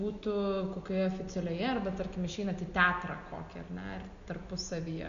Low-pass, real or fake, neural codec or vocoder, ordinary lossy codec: 7.2 kHz; real; none; MP3, 96 kbps